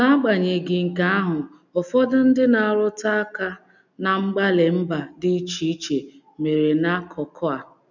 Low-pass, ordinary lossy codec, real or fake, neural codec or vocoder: 7.2 kHz; none; real; none